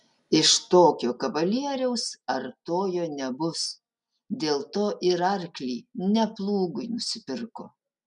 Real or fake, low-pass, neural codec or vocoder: real; 10.8 kHz; none